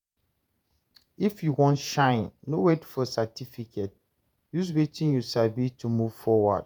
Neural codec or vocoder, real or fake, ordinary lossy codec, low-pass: vocoder, 48 kHz, 128 mel bands, Vocos; fake; none; none